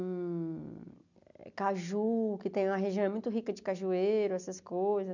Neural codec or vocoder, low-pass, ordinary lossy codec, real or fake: none; 7.2 kHz; none; real